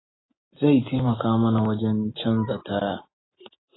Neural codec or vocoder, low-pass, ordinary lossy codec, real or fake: none; 7.2 kHz; AAC, 16 kbps; real